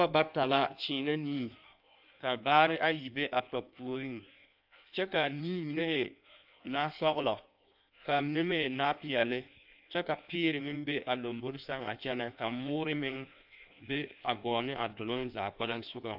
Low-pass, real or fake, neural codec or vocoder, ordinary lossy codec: 5.4 kHz; fake; codec, 16 kHz in and 24 kHz out, 1.1 kbps, FireRedTTS-2 codec; AAC, 48 kbps